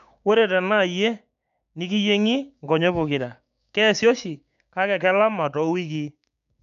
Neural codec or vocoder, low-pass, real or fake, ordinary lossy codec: codec, 16 kHz, 6 kbps, DAC; 7.2 kHz; fake; none